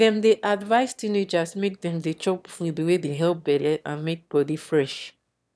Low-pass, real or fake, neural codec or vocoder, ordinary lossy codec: none; fake; autoencoder, 22.05 kHz, a latent of 192 numbers a frame, VITS, trained on one speaker; none